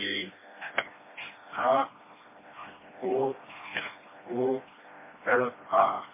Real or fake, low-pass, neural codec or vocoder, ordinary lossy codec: fake; 3.6 kHz; codec, 16 kHz, 1 kbps, FreqCodec, smaller model; MP3, 16 kbps